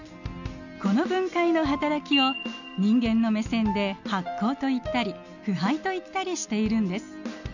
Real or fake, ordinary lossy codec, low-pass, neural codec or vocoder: real; none; 7.2 kHz; none